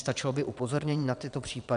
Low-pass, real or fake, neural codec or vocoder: 9.9 kHz; fake; vocoder, 22.05 kHz, 80 mel bands, WaveNeXt